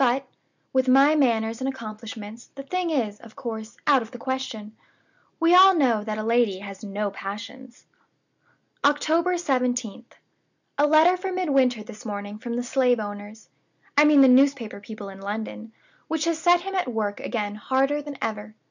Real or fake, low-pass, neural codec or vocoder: real; 7.2 kHz; none